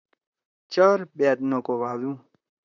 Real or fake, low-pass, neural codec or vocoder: fake; 7.2 kHz; vocoder, 44.1 kHz, 128 mel bands, Pupu-Vocoder